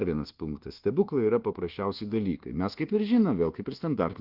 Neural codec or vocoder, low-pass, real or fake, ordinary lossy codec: codec, 24 kHz, 1.2 kbps, DualCodec; 5.4 kHz; fake; Opus, 16 kbps